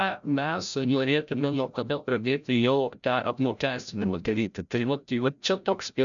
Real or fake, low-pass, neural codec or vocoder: fake; 7.2 kHz; codec, 16 kHz, 0.5 kbps, FreqCodec, larger model